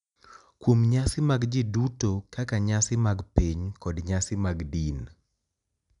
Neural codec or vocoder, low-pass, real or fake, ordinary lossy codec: none; 10.8 kHz; real; none